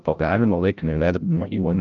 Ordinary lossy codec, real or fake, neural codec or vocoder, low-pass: Opus, 32 kbps; fake; codec, 16 kHz, 0.5 kbps, FreqCodec, larger model; 7.2 kHz